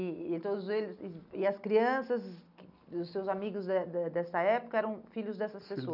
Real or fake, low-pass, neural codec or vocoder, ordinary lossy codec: real; 5.4 kHz; none; none